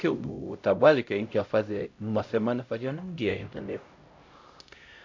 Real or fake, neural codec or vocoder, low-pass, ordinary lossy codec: fake; codec, 16 kHz, 0.5 kbps, X-Codec, HuBERT features, trained on LibriSpeech; 7.2 kHz; MP3, 48 kbps